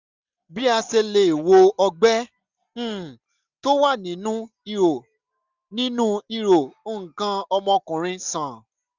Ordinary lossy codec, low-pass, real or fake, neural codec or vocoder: none; 7.2 kHz; real; none